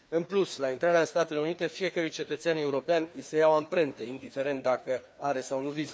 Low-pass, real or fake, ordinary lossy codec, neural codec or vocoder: none; fake; none; codec, 16 kHz, 2 kbps, FreqCodec, larger model